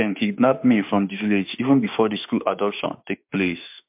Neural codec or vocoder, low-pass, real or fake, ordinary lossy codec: autoencoder, 48 kHz, 32 numbers a frame, DAC-VAE, trained on Japanese speech; 3.6 kHz; fake; MP3, 32 kbps